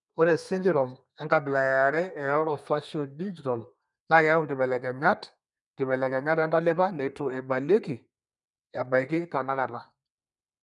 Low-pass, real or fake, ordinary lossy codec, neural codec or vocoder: 10.8 kHz; fake; none; codec, 32 kHz, 1.9 kbps, SNAC